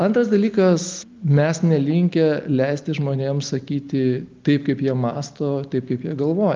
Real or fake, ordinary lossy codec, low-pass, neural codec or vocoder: real; Opus, 24 kbps; 7.2 kHz; none